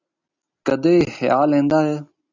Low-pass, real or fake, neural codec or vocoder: 7.2 kHz; real; none